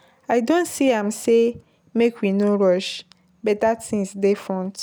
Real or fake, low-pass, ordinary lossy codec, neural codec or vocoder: real; none; none; none